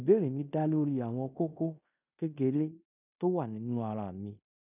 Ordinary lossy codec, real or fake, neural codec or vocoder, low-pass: none; fake; codec, 16 kHz in and 24 kHz out, 0.9 kbps, LongCat-Audio-Codec, fine tuned four codebook decoder; 3.6 kHz